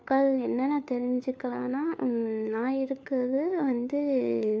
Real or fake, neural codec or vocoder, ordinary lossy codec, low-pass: fake; codec, 16 kHz, 4 kbps, FunCodec, trained on LibriTTS, 50 frames a second; none; none